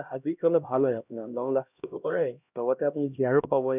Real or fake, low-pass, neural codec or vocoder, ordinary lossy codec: fake; 3.6 kHz; codec, 16 kHz, 1 kbps, X-Codec, HuBERT features, trained on LibriSpeech; none